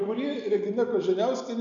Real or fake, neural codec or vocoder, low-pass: real; none; 7.2 kHz